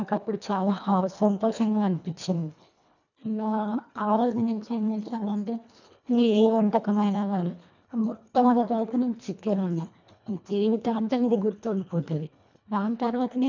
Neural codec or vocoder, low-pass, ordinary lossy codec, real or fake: codec, 24 kHz, 1.5 kbps, HILCodec; 7.2 kHz; none; fake